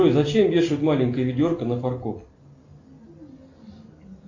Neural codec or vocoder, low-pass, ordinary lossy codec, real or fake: none; 7.2 kHz; AAC, 48 kbps; real